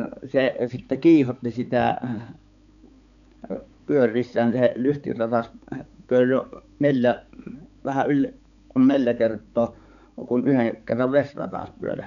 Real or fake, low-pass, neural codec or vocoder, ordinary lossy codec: fake; 7.2 kHz; codec, 16 kHz, 4 kbps, X-Codec, HuBERT features, trained on balanced general audio; none